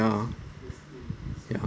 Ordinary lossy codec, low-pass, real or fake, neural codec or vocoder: none; none; real; none